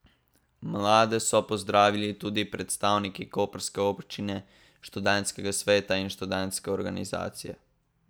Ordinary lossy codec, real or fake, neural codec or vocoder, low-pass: none; real; none; none